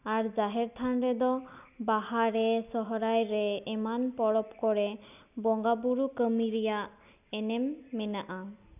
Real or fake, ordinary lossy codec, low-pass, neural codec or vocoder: real; none; 3.6 kHz; none